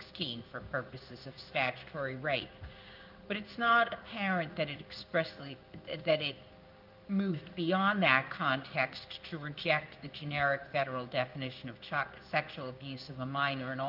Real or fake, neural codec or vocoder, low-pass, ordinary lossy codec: fake; codec, 16 kHz in and 24 kHz out, 1 kbps, XY-Tokenizer; 5.4 kHz; Opus, 24 kbps